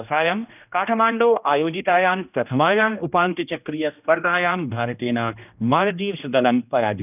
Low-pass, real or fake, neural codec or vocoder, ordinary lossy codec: 3.6 kHz; fake; codec, 16 kHz, 1 kbps, X-Codec, HuBERT features, trained on general audio; none